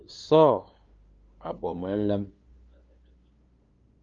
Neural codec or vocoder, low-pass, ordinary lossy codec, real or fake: codec, 16 kHz, 2 kbps, FunCodec, trained on LibriTTS, 25 frames a second; 7.2 kHz; Opus, 32 kbps; fake